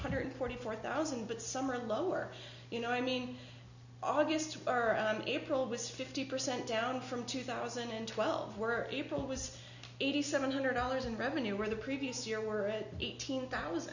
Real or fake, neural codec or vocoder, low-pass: real; none; 7.2 kHz